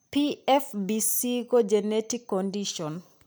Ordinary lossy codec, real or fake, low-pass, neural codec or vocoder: none; real; none; none